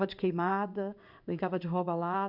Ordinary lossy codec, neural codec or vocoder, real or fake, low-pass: none; codec, 16 kHz in and 24 kHz out, 1 kbps, XY-Tokenizer; fake; 5.4 kHz